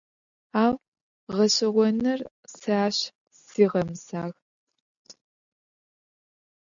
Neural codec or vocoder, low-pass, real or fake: none; 7.2 kHz; real